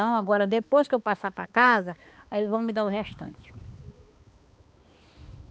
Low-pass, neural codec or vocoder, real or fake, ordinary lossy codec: none; codec, 16 kHz, 2 kbps, X-Codec, HuBERT features, trained on balanced general audio; fake; none